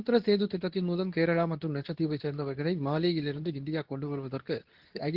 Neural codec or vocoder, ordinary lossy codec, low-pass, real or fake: codec, 24 kHz, 0.9 kbps, WavTokenizer, medium speech release version 2; Opus, 16 kbps; 5.4 kHz; fake